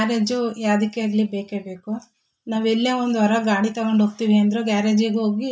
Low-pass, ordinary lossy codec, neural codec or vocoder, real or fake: none; none; none; real